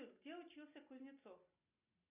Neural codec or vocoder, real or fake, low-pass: none; real; 3.6 kHz